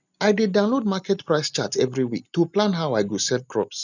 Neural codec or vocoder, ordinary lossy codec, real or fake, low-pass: none; none; real; 7.2 kHz